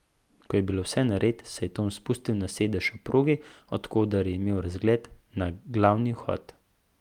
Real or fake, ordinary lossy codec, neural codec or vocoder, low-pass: real; Opus, 32 kbps; none; 19.8 kHz